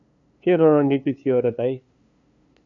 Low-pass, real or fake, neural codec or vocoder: 7.2 kHz; fake; codec, 16 kHz, 2 kbps, FunCodec, trained on LibriTTS, 25 frames a second